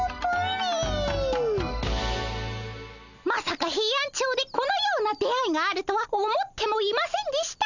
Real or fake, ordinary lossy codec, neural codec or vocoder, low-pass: real; none; none; 7.2 kHz